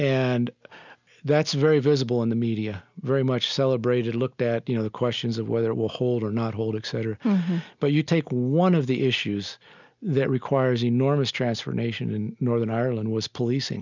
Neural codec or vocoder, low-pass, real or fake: none; 7.2 kHz; real